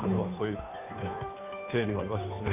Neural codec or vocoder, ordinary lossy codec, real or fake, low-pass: codec, 16 kHz, 2 kbps, FunCodec, trained on Chinese and English, 25 frames a second; none; fake; 3.6 kHz